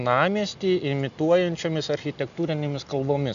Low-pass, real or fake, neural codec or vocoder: 7.2 kHz; real; none